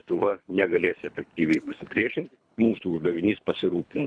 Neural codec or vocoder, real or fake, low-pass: codec, 24 kHz, 3 kbps, HILCodec; fake; 9.9 kHz